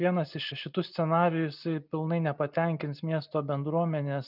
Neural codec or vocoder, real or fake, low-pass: none; real; 5.4 kHz